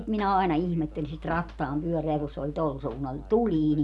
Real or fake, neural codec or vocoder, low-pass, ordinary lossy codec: real; none; none; none